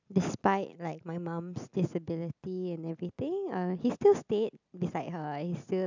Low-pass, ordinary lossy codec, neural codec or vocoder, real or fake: 7.2 kHz; none; none; real